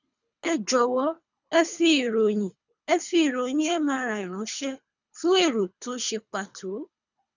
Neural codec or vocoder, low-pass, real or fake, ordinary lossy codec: codec, 24 kHz, 3 kbps, HILCodec; 7.2 kHz; fake; none